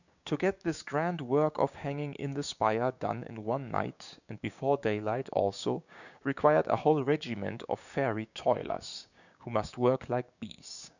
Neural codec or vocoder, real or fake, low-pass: codec, 16 kHz, 6 kbps, DAC; fake; 7.2 kHz